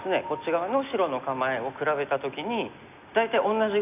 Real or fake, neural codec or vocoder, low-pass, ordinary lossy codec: real; none; 3.6 kHz; none